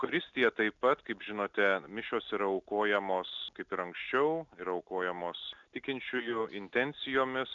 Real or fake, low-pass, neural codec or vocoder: real; 7.2 kHz; none